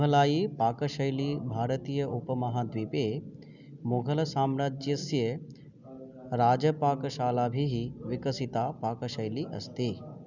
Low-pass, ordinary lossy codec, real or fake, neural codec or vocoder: 7.2 kHz; none; real; none